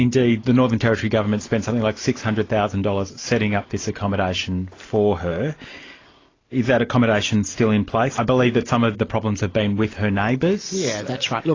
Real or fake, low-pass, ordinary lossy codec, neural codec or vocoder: real; 7.2 kHz; AAC, 32 kbps; none